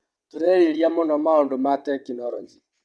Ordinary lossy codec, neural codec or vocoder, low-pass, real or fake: none; vocoder, 22.05 kHz, 80 mel bands, WaveNeXt; none; fake